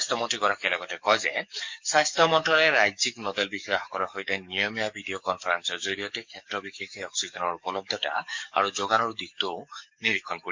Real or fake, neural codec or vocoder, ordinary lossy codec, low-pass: fake; codec, 44.1 kHz, 7.8 kbps, DAC; MP3, 48 kbps; 7.2 kHz